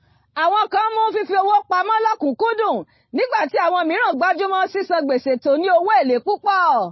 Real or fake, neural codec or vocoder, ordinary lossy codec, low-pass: real; none; MP3, 24 kbps; 7.2 kHz